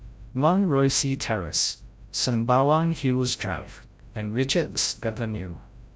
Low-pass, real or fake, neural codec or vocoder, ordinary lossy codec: none; fake; codec, 16 kHz, 0.5 kbps, FreqCodec, larger model; none